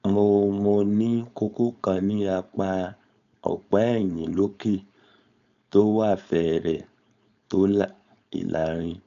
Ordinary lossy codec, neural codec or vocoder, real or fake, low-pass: none; codec, 16 kHz, 4.8 kbps, FACodec; fake; 7.2 kHz